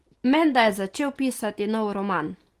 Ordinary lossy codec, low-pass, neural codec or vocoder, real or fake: Opus, 24 kbps; 14.4 kHz; none; real